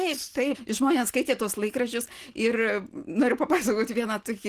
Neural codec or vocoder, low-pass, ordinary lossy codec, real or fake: none; 14.4 kHz; Opus, 16 kbps; real